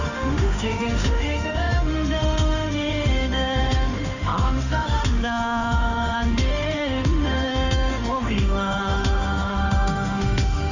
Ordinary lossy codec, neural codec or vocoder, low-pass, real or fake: none; autoencoder, 48 kHz, 32 numbers a frame, DAC-VAE, trained on Japanese speech; 7.2 kHz; fake